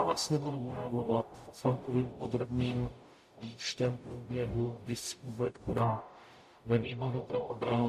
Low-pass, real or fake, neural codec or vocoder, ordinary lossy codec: 14.4 kHz; fake; codec, 44.1 kHz, 0.9 kbps, DAC; MP3, 64 kbps